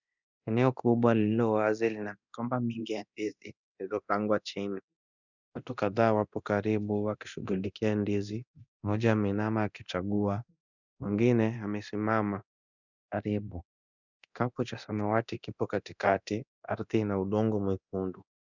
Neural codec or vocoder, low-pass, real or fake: codec, 24 kHz, 0.9 kbps, DualCodec; 7.2 kHz; fake